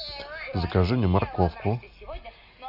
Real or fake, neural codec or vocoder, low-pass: real; none; 5.4 kHz